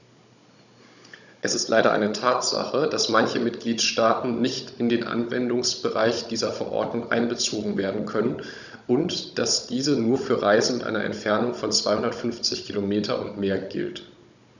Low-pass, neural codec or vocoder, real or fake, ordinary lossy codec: 7.2 kHz; codec, 16 kHz, 16 kbps, FunCodec, trained on Chinese and English, 50 frames a second; fake; none